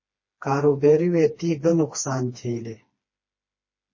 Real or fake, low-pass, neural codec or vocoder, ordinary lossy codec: fake; 7.2 kHz; codec, 16 kHz, 2 kbps, FreqCodec, smaller model; MP3, 32 kbps